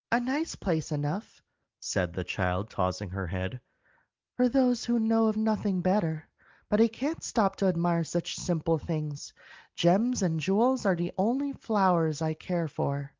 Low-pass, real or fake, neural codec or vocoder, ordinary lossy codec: 7.2 kHz; real; none; Opus, 32 kbps